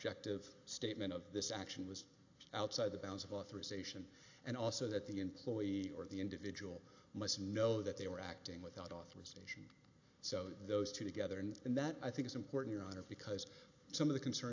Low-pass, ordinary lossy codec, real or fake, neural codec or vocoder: 7.2 kHz; AAC, 48 kbps; real; none